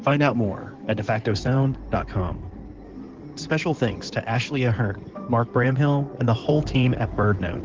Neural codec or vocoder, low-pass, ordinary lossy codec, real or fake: codec, 16 kHz in and 24 kHz out, 2.2 kbps, FireRedTTS-2 codec; 7.2 kHz; Opus, 16 kbps; fake